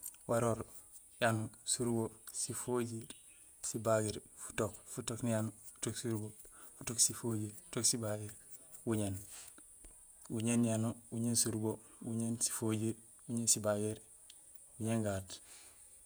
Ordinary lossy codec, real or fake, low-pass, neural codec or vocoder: none; fake; none; vocoder, 48 kHz, 128 mel bands, Vocos